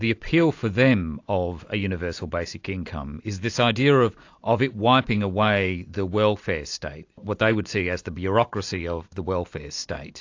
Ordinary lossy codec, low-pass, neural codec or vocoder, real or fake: AAC, 48 kbps; 7.2 kHz; none; real